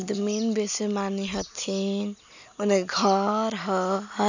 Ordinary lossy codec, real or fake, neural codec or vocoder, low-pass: none; real; none; 7.2 kHz